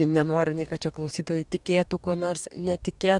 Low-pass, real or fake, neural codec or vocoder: 10.8 kHz; fake; codec, 44.1 kHz, 2.6 kbps, DAC